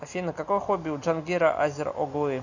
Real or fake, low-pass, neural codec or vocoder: real; 7.2 kHz; none